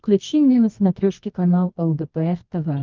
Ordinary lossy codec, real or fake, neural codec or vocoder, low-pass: Opus, 24 kbps; fake; codec, 24 kHz, 0.9 kbps, WavTokenizer, medium music audio release; 7.2 kHz